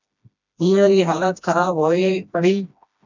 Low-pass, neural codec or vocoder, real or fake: 7.2 kHz; codec, 16 kHz, 1 kbps, FreqCodec, smaller model; fake